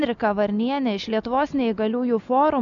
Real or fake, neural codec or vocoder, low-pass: real; none; 7.2 kHz